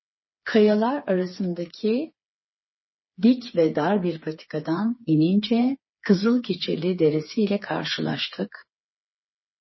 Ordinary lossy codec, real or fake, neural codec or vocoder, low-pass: MP3, 24 kbps; fake; codec, 16 kHz, 4 kbps, FreqCodec, smaller model; 7.2 kHz